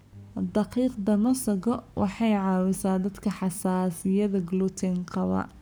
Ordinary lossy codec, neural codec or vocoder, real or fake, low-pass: none; codec, 44.1 kHz, 7.8 kbps, Pupu-Codec; fake; none